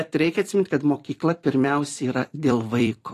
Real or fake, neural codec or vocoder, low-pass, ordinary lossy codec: fake; vocoder, 44.1 kHz, 128 mel bands every 256 samples, BigVGAN v2; 14.4 kHz; AAC, 48 kbps